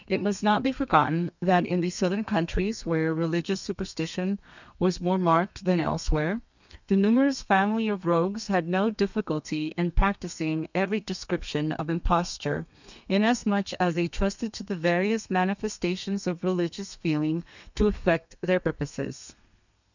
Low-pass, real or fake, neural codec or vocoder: 7.2 kHz; fake; codec, 32 kHz, 1.9 kbps, SNAC